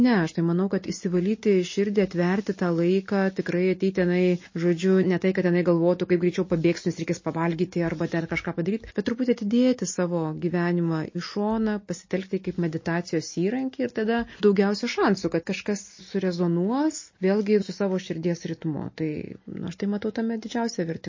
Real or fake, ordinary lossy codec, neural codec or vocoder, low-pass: real; MP3, 32 kbps; none; 7.2 kHz